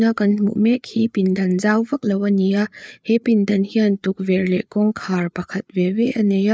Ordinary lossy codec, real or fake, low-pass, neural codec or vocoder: none; fake; none; codec, 16 kHz, 4 kbps, FreqCodec, larger model